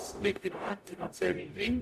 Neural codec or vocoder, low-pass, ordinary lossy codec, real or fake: codec, 44.1 kHz, 0.9 kbps, DAC; 14.4 kHz; none; fake